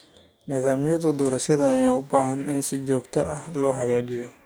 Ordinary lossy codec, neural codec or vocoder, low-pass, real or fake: none; codec, 44.1 kHz, 2.6 kbps, DAC; none; fake